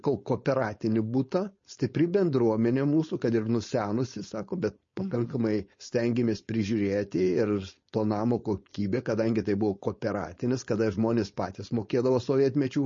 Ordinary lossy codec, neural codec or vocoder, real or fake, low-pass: MP3, 32 kbps; codec, 16 kHz, 4.8 kbps, FACodec; fake; 7.2 kHz